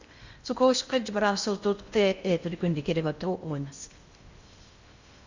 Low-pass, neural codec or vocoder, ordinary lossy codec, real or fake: 7.2 kHz; codec, 16 kHz in and 24 kHz out, 0.6 kbps, FocalCodec, streaming, 2048 codes; Opus, 64 kbps; fake